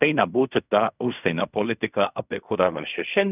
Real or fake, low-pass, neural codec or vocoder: fake; 3.6 kHz; codec, 16 kHz in and 24 kHz out, 0.4 kbps, LongCat-Audio-Codec, fine tuned four codebook decoder